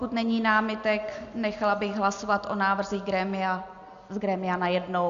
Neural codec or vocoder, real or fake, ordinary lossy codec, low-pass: none; real; Opus, 64 kbps; 7.2 kHz